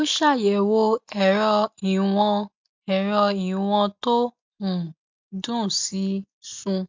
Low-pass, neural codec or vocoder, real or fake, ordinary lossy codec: 7.2 kHz; none; real; MP3, 64 kbps